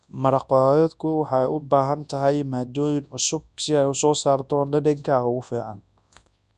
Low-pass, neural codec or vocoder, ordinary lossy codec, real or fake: 10.8 kHz; codec, 24 kHz, 0.9 kbps, WavTokenizer, large speech release; none; fake